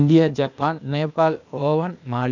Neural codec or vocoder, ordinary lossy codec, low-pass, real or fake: codec, 16 kHz, 0.8 kbps, ZipCodec; none; 7.2 kHz; fake